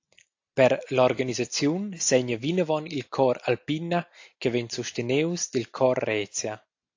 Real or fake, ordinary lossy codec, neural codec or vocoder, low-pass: real; AAC, 48 kbps; none; 7.2 kHz